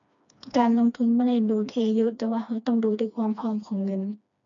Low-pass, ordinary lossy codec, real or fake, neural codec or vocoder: 7.2 kHz; none; fake; codec, 16 kHz, 2 kbps, FreqCodec, smaller model